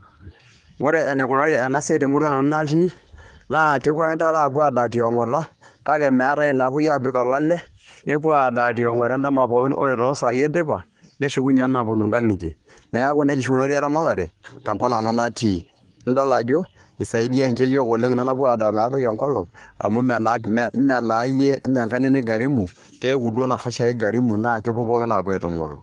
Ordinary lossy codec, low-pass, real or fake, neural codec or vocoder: Opus, 32 kbps; 10.8 kHz; fake; codec, 24 kHz, 1 kbps, SNAC